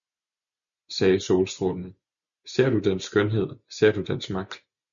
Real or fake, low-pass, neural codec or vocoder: real; 7.2 kHz; none